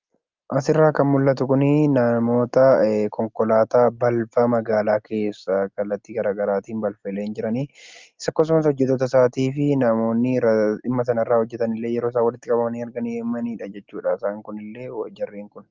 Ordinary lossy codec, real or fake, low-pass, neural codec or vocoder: Opus, 32 kbps; real; 7.2 kHz; none